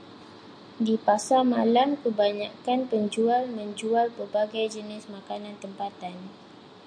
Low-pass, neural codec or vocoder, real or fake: 9.9 kHz; none; real